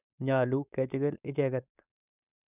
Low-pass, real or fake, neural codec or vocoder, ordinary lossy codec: 3.6 kHz; fake; codec, 16 kHz, 4.8 kbps, FACodec; none